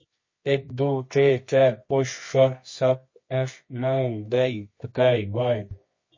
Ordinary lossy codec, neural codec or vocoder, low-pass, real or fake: MP3, 32 kbps; codec, 24 kHz, 0.9 kbps, WavTokenizer, medium music audio release; 7.2 kHz; fake